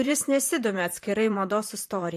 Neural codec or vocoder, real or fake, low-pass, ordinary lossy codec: vocoder, 44.1 kHz, 128 mel bands, Pupu-Vocoder; fake; 14.4 kHz; MP3, 64 kbps